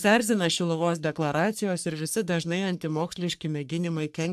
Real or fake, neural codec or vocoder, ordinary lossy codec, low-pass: fake; codec, 44.1 kHz, 3.4 kbps, Pupu-Codec; AAC, 96 kbps; 14.4 kHz